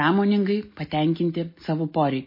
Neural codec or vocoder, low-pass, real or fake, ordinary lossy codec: none; 5.4 kHz; real; MP3, 24 kbps